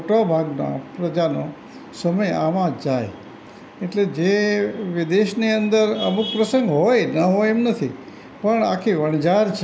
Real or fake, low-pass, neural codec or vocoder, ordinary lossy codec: real; none; none; none